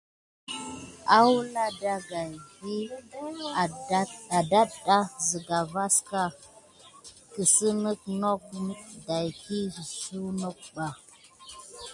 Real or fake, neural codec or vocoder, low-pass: real; none; 10.8 kHz